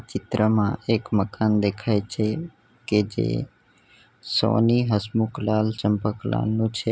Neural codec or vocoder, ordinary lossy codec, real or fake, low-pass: none; none; real; none